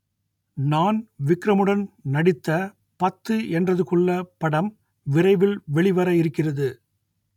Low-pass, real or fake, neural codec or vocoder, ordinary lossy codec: 19.8 kHz; real; none; none